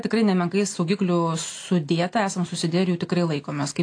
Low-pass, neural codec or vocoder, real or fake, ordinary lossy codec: 9.9 kHz; none; real; AAC, 48 kbps